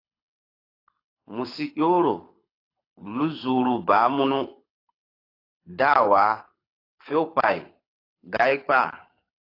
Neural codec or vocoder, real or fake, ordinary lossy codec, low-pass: codec, 24 kHz, 6 kbps, HILCodec; fake; AAC, 32 kbps; 5.4 kHz